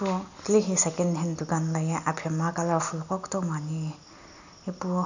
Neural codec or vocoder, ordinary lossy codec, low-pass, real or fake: none; none; 7.2 kHz; real